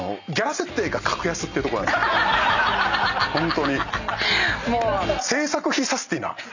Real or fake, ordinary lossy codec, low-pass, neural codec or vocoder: real; none; 7.2 kHz; none